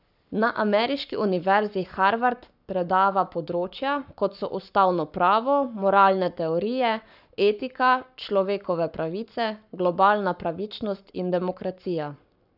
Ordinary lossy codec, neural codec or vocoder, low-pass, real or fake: none; codec, 44.1 kHz, 7.8 kbps, Pupu-Codec; 5.4 kHz; fake